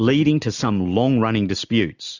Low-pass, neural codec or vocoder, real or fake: 7.2 kHz; none; real